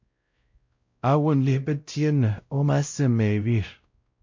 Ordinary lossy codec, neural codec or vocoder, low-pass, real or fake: MP3, 48 kbps; codec, 16 kHz, 0.5 kbps, X-Codec, WavLM features, trained on Multilingual LibriSpeech; 7.2 kHz; fake